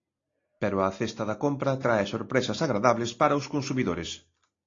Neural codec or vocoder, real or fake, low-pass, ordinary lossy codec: none; real; 7.2 kHz; AAC, 32 kbps